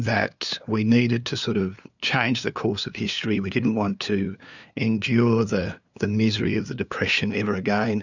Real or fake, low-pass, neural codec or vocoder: fake; 7.2 kHz; codec, 16 kHz, 2 kbps, FunCodec, trained on LibriTTS, 25 frames a second